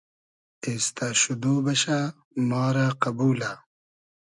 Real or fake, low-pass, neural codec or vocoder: real; 10.8 kHz; none